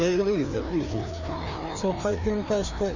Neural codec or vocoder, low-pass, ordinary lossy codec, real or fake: codec, 16 kHz, 2 kbps, FreqCodec, larger model; 7.2 kHz; none; fake